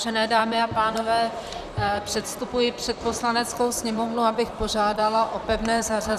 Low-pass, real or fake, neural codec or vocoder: 14.4 kHz; fake; vocoder, 44.1 kHz, 128 mel bands, Pupu-Vocoder